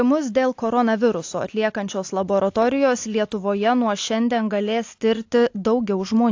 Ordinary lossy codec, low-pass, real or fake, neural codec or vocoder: AAC, 48 kbps; 7.2 kHz; real; none